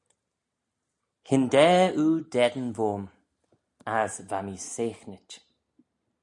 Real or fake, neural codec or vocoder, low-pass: real; none; 10.8 kHz